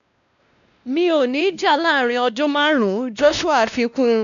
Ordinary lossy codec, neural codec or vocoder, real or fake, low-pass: none; codec, 16 kHz, 1 kbps, X-Codec, WavLM features, trained on Multilingual LibriSpeech; fake; 7.2 kHz